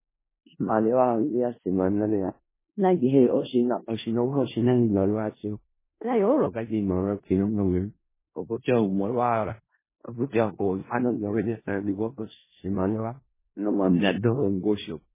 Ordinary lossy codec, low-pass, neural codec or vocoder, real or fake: MP3, 16 kbps; 3.6 kHz; codec, 16 kHz in and 24 kHz out, 0.4 kbps, LongCat-Audio-Codec, four codebook decoder; fake